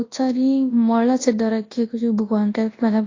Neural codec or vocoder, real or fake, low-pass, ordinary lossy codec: codec, 24 kHz, 0.9 kbps, WavTokenizer, large speech release; fake; 7.2 kHz; AAC, 32 kbps